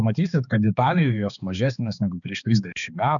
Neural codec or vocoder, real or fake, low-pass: codec, 16 kHz, 2 kbps, X-Codec, HuBERT features, trained on balanced general audio; fake; 7.2 kHz